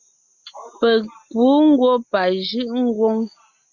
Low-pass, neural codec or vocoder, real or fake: 7.2 kHz; none; real